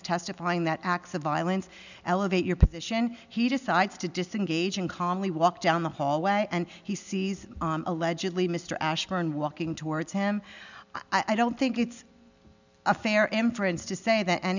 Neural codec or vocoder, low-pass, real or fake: none; 7.2 kHz; real